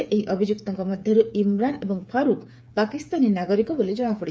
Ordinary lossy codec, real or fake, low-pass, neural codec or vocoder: none; fake; none; codec, 16 kHz, 8 kbps, FreqCodec, smaller model